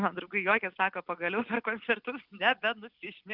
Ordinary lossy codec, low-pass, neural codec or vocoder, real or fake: Opus, 24 kbps; 5.4 kHz; none; real